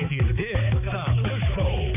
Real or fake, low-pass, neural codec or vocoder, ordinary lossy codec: fake; 3.6 kHz; codec, 16 kHz, 4 kbps, X-Codec, HuBERT features, trained on balanced general audio; none